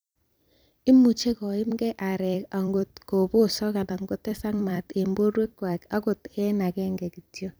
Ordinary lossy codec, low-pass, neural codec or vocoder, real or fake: none; none; vocoder, 44.1 kHz, 128 mel bands every 256 samples, BigVGAN v2; fake